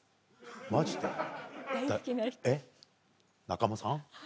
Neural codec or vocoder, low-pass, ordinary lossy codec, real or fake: none; none; none; real